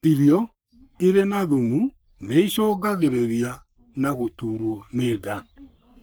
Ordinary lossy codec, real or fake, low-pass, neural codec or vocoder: none; fake; none; codec, 44.1 kHz, 3.4 kbps, Pupu-Codec